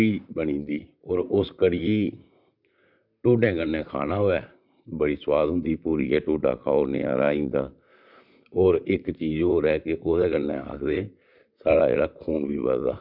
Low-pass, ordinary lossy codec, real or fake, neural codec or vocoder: 5.4 kHz; none; fake; vocoder, 44.1 kHz, 128 mel bands, Pupu-Vocoder